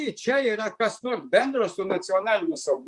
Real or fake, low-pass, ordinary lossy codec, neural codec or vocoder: fake; 10.8 kHz; Opus, 64 kbps; vocoder, 44.1 kHz, 128 mel bands, Pupu-Vocoder